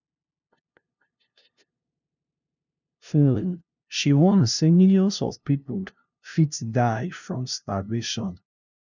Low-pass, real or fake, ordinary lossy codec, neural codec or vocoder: 7.2 kHz; fake; none; codec, 16 kHz, 0.5 kbps, FunCodec, trained on LibriTTS, 25 frames a second